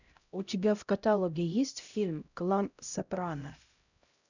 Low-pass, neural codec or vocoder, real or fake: 7.2 kHz; codec, 16 kHz, 0.5 kbps, X-Codec, HuBERT features, trained on LibriSpeech; fake